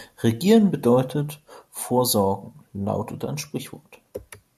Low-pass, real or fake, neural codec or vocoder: 14.4 kHz; real; none